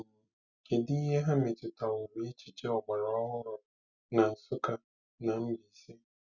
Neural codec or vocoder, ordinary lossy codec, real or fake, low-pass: none; none; real; 7.2 kHz